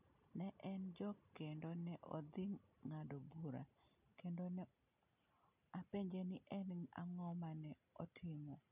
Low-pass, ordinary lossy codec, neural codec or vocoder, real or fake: 3.6 kHz; none; none; real